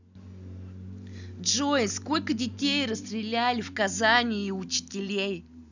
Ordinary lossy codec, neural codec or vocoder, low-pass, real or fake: none; none; 7.2 kHz; real